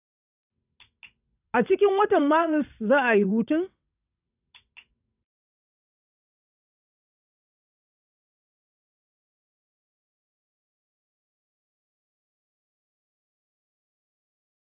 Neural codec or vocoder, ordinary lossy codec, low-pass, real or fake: vocoder, 22.05 kHz, 80 mel bands, WaveNeXt; none; 3.6 kHz; fake